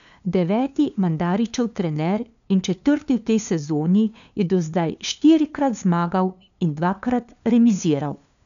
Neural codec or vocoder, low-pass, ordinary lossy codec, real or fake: codec, 16 kHz, 2 kbps, FunCodec, trained on LibriTTS, 25 frames a second; 7.2 kHz; none; fake